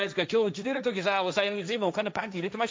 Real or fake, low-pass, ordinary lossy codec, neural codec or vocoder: fake; none; none; codec, 16 kHz, 1.1 kbps, Voila-Tokenizer